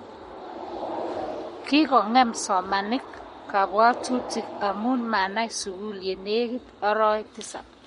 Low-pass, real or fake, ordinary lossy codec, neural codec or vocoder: 19.8 kHz; fake; MP3, 48 kbps; codec, 44.1 kHz, 7.8 kbps, Pupu-Codec